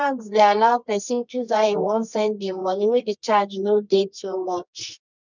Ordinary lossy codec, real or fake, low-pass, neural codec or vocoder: none; fake; 7.2 kHz; codec, 24 kHz, 0.9 kbps, WavTokenizer, medium music audio release